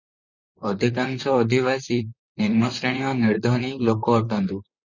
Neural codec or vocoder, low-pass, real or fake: vocoder, 44.1 kHz, 128 mel bands, Pupu-Vocoder; 7.2 kHz; fake